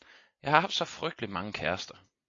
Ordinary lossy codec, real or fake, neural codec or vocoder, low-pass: AAC, 48 kbps; real; none; 7.2 kHz